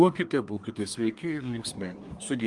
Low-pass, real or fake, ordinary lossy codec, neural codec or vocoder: 10.8 kHz; fake; Opus, 32 kbps; codec, 24 kHz, 1 kbps, SNAC